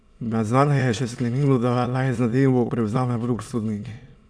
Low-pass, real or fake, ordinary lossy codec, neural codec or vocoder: none; fake; none; autoencoder, 22.05 kHz, a latent of 192 numbers a frame, VITS, trained on many speakers